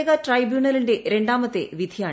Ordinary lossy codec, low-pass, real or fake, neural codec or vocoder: none; none; real; none